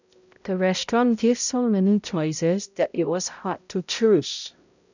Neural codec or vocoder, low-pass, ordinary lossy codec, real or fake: codec, 16 kHz, 0.5 kbps, X-Codec, HuBERT features, trained on balanced general audio; 7.2 kHz; none; fake